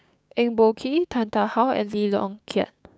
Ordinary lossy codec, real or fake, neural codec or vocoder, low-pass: none; fake; codec, 16 kHz, 6 kbps, DAC; none